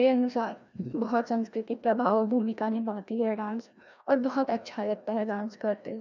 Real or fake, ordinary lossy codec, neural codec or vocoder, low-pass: fake; none; codec, 16 kHz, 1 kbps, FreqCodec, larger model; 7.2 kHz